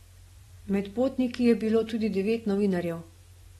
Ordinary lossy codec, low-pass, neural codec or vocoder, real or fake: AAC, 32 kbps; 19.8 kHz; none; real